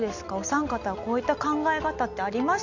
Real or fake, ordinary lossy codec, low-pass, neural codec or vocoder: real; none; 7.2 kHz; none